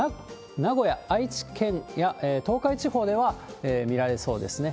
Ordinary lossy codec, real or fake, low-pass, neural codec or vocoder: none; real; none; none